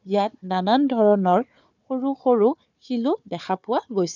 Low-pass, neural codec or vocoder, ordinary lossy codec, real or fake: 7.2 kHz; codec, 16 kHz, 4 kbps, FunCodec, trained on Chinese and English, 50 frames a second; none; fake